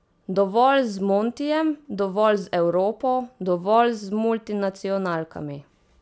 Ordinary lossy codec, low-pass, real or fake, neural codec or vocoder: none; none; real; none